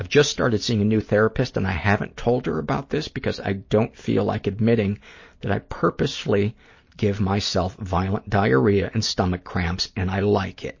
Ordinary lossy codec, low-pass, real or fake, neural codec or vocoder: MP3, 32 kbps; 7.2 kHz; real; none